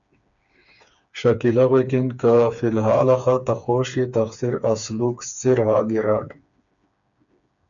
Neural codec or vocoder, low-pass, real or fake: codec, 16 kHz, 4 kbps, FreqCodec, smaller model; 7.2 kHz; fake